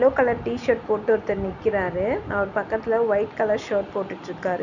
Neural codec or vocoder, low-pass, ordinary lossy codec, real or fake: none; 7.2 kHz; none; real